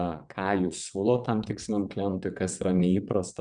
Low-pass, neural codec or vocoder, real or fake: 9.9 kHz; vocoder, 22.05 kHz, 80 mel bands, WaveNeXt; fake